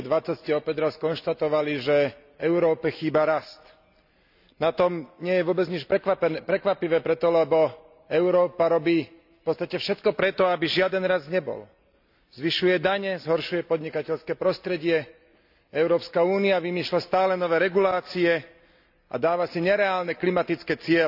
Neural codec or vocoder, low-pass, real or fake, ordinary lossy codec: none; 5.4 kHz; real; none